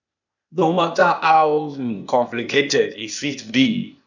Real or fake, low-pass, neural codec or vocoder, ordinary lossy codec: fake; 7.2 kHz; codec, 16 kHz, 0.8 kbps, ZipCodec; none